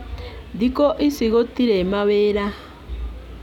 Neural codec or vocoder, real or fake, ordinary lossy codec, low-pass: none; real; none; 19.8 kHz